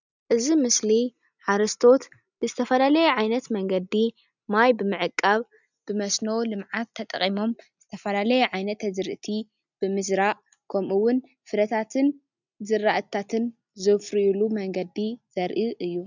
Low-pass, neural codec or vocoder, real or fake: 7.2 kHz; none; real